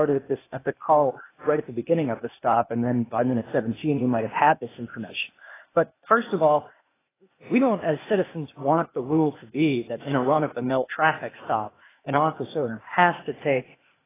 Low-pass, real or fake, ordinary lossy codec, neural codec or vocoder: 3.6 kHz; fake; AAC, 16 kbps; codec, 16 kHz, 0.8 kbps, ZipCodec